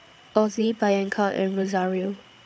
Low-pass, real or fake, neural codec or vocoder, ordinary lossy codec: none; fake; codec, 16 kHz, 8 kbps, FreqCodec, larger model; none